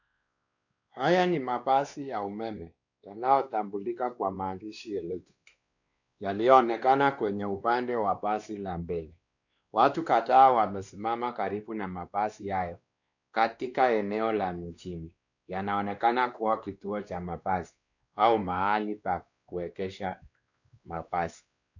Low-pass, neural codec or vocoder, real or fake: 7.2 kHz; codec, 16 kHz, 2 kbps, X-Codec, WavLM features, trained on Multilingual LibriSpeech; fake